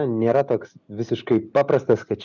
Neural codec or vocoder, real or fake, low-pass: none; real; 7.2 kHz